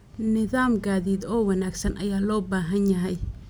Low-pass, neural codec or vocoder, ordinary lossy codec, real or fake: none; none; none; real